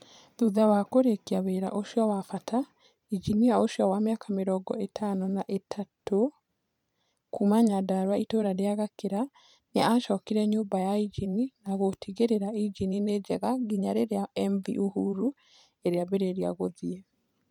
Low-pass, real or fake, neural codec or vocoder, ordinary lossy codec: 19.8 kHz; fake; vocoder, 44.1 kHz, 128 mel bands every 512 samples, BigVGAN v2; none